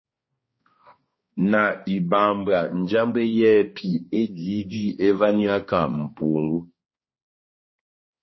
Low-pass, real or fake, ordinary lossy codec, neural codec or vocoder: 7.2 kHz; fake; MP3, 24 kbps; codec, 16 kHz, 2 kbps, X-Codec, HuBERT features, trained on balanced general audio